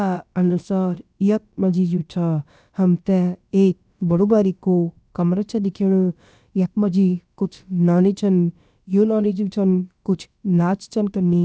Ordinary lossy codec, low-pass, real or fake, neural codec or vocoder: none; none; fake; codec, 16 kHz, about 1 kbps, DyCAST, with the encoder's durations